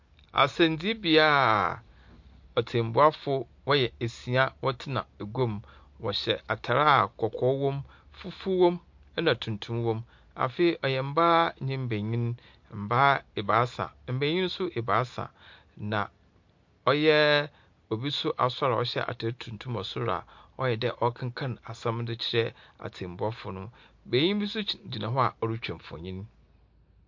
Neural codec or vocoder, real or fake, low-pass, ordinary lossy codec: none; real; 7.2 kHz; MP3, 48 kbps